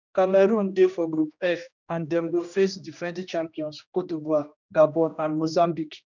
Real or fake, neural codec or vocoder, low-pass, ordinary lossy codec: fake; codec, 16 kHz, 1 kbps, X-Codec, HuBERT features, trained on general audio; 7.2 kHz; none